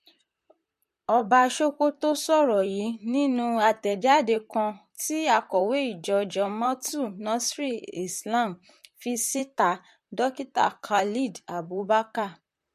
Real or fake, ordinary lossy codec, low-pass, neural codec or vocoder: fake; MP3, 64 kbps; 14.4 kHz; vocoder, 44.1 kHz, 128 mel bands, Pupu-Vocoder